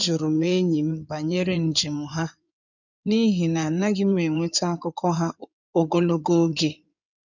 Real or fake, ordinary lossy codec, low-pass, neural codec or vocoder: fake; none; 7.2 kHz; codec, 16 kHz in and 24 kHz out, 2.2 kbps, FireRedTTS-2 codec